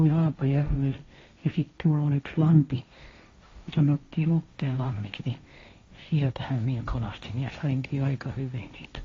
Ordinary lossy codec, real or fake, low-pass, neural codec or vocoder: AAC, 32 kbps; fake; 7.2 kHz; codec, 16 kHz, 1.1 kbps, Voila-Tokenizer